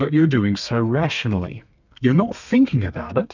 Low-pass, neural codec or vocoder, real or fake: 7.2 kHz; codec, 32 kHz, 1.9 kbps, SNAC; fake